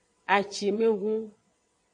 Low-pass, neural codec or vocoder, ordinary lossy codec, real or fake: 9.9 kHz; vocoder, 22.05 kHz, 80 mel bands, WaveNeXt; MP3, 48 kbps; fake